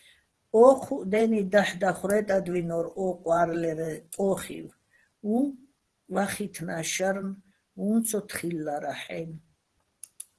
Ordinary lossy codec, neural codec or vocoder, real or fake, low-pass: Opus, 16 kbps; vocoder, 44.1 kHz, 128 mel bands every 512 samples, BigVGAN v2; fake; 10.8 kHz